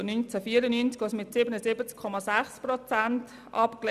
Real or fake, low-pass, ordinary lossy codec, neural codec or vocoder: real; 14.4 kHz; none; none